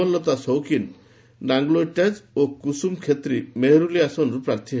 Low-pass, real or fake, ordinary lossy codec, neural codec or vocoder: none; real; none; none